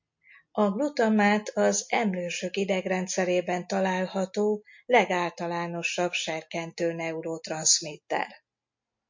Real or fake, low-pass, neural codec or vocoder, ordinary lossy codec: real; 7.2 kHz; none; MP3, 48 kbps